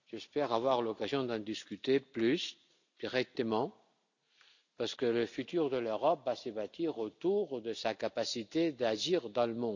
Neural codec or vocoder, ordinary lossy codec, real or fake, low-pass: none; none; real; 7.2 kHz